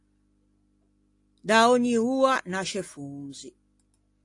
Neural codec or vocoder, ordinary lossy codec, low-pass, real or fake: none; AAC, 48 kbps; 10.8 kHz; real